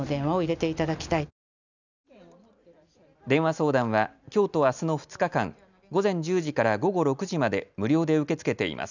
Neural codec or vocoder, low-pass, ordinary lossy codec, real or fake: none; 7.2 kHz; none; real